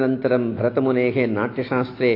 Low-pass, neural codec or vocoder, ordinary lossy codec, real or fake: 5.4 kHz; none; AAC, 32 kbps; real